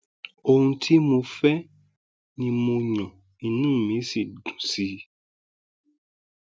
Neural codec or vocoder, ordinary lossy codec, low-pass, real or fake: none; none; none; real